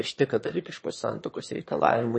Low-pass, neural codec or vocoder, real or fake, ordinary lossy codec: 9.9 kHz; autoencoder, 22.05 kHz, a latent of 192 numbers a frame, VITS, trained on one speaker; fake; MP3, 32 kbps